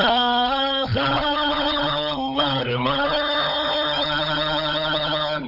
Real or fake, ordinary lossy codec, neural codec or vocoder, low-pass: fake; none; codec, 16 kHz, 16 kbps, FunCodec, trained on LibriTTS, 50 frames a second; 5.4 kHz